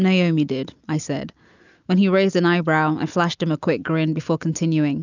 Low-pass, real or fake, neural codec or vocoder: 7.2 kHz; real; none